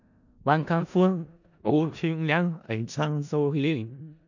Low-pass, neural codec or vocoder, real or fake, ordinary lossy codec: 7.2 kHz; codec, 16 kHz in and 24 kHz out, 0.4 kbps, LongCat-Audio-Codec, four codebook decoder; fake; none